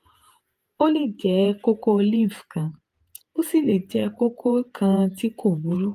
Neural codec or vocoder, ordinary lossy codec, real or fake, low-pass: vocoder, 48 kHz, 128 mel bands, Vocos; Opus, 32 kbps; fake; 14.4 kHz